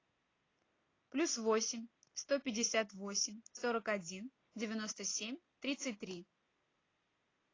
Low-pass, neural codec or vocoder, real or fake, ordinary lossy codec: 7.2 kHz; none; real; AAC, 32 kbps